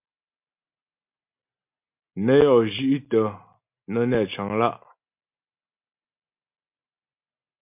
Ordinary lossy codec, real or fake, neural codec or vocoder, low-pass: MP3, 32 kbps; real; none; 3.6 kHz